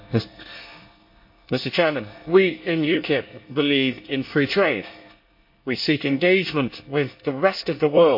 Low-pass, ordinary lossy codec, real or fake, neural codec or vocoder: 5.4 kHz; MP3, 32 kbps; fake; codec, 24 kHz, 1 kbps, SNAC